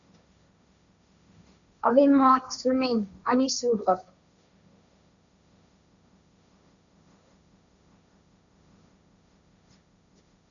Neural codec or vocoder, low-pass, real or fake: codec, 16 kHz, 1.1 kbps, Voila-Tokenizer; 7.2 kHz; fake